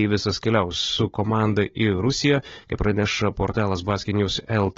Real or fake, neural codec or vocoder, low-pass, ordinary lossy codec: fake; codec, 16 kHz, 16 kbps, FunCodec, trained on Chinese and English, 50 frames a second; 7.2 kHz; AAC, 24 kbps